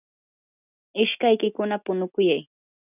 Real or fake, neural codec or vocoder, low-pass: real; none; 3.6 kHz